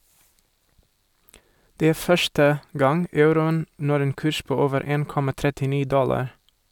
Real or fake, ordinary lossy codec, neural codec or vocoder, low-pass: real; none; none; 19.8 kHz